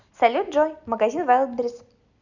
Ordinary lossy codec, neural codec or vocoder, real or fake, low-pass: none; none; real; 7.2 kHz